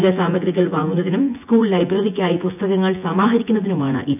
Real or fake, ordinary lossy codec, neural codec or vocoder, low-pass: fake; none; vocoder, 24 kHz, 100 mel bands, Vocos; 3.6 kHz